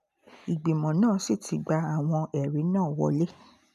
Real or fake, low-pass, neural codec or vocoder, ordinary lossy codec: real; 14.4 kHz; none; none